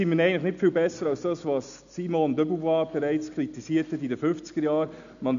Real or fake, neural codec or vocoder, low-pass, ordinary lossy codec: real; none; 7.2 kHz; none